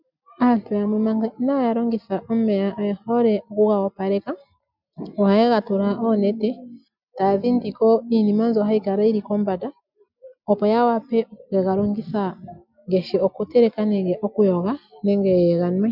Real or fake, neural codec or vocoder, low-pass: real; none; 5.4 kHz